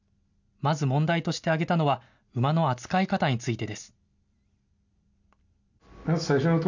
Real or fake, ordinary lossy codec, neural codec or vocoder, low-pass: real; none; none; 7.2 kHz